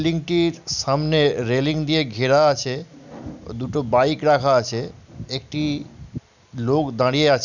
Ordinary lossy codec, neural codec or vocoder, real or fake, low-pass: none; none; real; 7.2 kHz